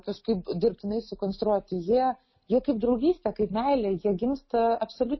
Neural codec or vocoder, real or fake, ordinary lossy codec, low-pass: none; real; MP3, 24 kbps; 7.2 kHz